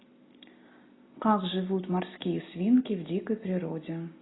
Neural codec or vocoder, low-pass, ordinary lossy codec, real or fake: none; 7.2 kHz; AAC, 16 kbps; real